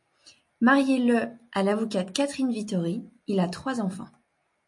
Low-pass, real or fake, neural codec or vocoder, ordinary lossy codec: 10.8 kHz; real; none; MP3, 48 kbps